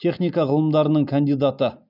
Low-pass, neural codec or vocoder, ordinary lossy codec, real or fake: 5.4 kHz; none; none; real